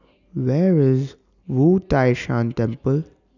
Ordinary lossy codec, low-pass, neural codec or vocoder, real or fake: none; 7.2 kHz; none; real